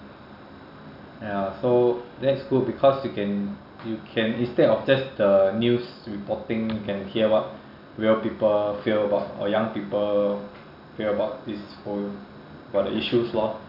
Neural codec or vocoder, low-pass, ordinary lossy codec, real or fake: none; 5.4 kHz; none; real